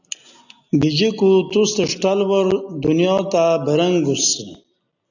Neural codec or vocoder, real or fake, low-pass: none; real; 7.2 kHz